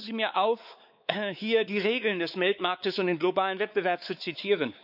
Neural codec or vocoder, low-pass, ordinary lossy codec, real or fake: codec, 16 kHz, 4 kbps, X-Codec, WavLM features, trained on Multilingual LibriSpeech; 5.4 kHz; none; fake